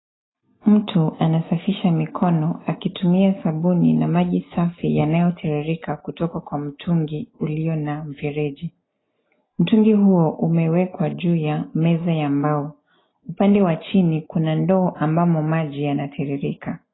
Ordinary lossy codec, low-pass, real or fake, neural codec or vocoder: AAC, 16 kbps; 7.2 kHz; real; none